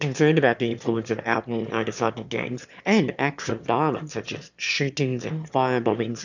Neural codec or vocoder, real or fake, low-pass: autoencoder, 22.05 kHz, a latent of 192 numbers a frame, VITS, trained on one speaker; fake; 7.2 kHz